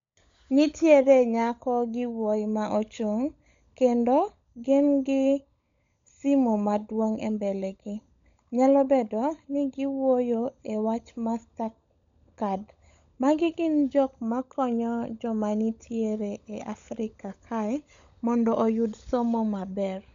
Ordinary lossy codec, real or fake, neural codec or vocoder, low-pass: MP3, 64 kbps; fake; codec, 16 kHz, 16 kbps, FunCodec, trained on LibriTTS, 50 frames a second; 7.2 kHz